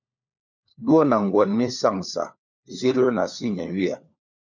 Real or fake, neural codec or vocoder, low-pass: fake; codec, 16 kHz, 4 kbps, FunCodec, trained on LibriTTS, 50 frames a second; 7.2 kHz